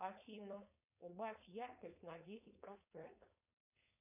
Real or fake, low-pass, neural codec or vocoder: fake; 3.6 kHz; codec, 16 kHz, 4.8 kbps, FACodec